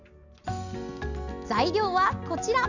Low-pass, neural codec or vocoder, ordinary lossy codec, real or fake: 7.2 kHz; none; none; real